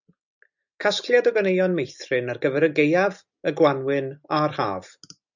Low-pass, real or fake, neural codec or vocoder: 7.2 kHz; real; none